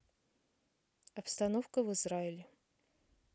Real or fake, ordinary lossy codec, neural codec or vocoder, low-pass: real; none; none; none